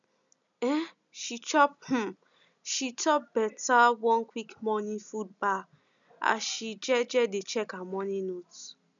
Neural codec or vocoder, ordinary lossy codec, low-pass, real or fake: none; none; 7.2 kHz; real